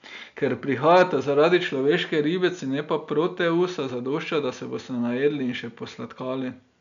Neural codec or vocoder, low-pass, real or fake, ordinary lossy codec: none; 7.2 kHz; real; none